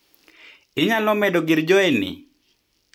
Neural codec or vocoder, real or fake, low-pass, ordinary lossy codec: none; real; 19.8 kHz; none